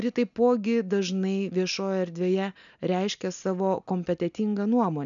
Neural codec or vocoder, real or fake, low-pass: none; real; 7.2 kHz